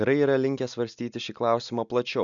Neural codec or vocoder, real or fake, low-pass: none; real; 7.2 kHz